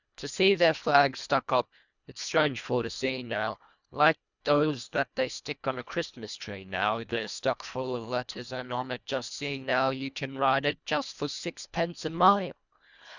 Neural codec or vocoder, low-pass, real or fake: codec, 24 kHz, 1.5 kbps, HILCodec; 7.2 kHz; fake